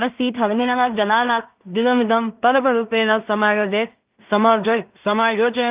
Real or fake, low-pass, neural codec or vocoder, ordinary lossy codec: fake; 3.6 kHz; codec, 16 kHz in and 24 kHz out, 0.4 kbps, LongCat-Audio-Codec, two codebook decoder; Opus, 32 kbps